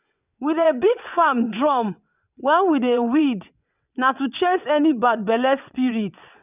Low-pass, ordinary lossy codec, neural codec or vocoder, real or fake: 3.6 kHz; none; vocoder, 22.05 kHz, 80 mel bands, WaveNeXt; fake